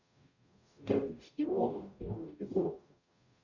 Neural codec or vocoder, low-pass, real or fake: codec, 44.1 kHz, 0.9 kbps, DAC; 7.2 kHz; fake